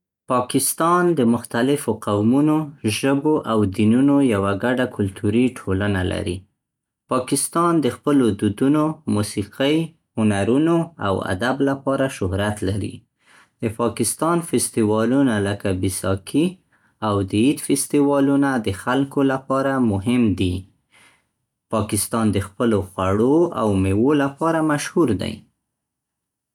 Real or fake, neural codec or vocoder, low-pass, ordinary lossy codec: real; none; 19.8 kHz; none